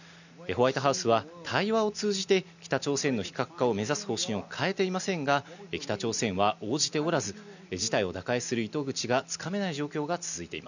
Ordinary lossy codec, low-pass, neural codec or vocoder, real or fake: none; 7.2 kHz; none; real